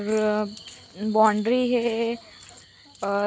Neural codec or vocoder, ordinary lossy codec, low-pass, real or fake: none; none; none; real